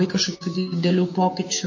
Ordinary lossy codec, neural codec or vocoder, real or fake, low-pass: MP3, 32 kbps; codec, 16 kHz, 6 kbps, DAC; fake; 7.2 kHz